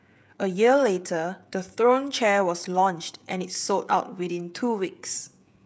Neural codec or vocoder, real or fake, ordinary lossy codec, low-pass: codec, 16 kHz, 16 kbps, FreqCodec, smaller model; fake; none; none